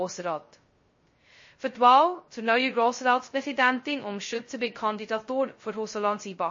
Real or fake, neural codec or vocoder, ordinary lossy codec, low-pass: fake; codec, 16 kHz, 0.2 kbps, FocalCodec; MP3, 32 kbps; 7.2 kHz